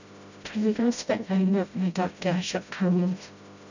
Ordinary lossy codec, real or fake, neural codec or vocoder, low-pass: none; fake; codec, 16 kHz, 0.5 kbps, FreqCodec, smaller model; 7.2 kHz